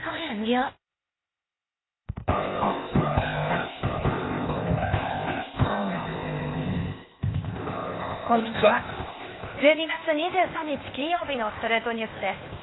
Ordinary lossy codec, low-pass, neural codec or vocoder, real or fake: AAC, 16 kbps; 7.2 kHz; codec, 16 kHz, 0.8 kbps, ZipCodec; fake